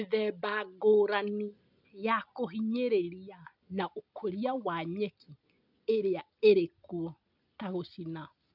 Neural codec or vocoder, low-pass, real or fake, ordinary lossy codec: none; 5.4 kHz; real; none